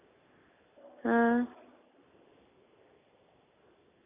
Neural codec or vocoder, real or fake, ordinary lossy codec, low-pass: none; real; none; 3.6 kHz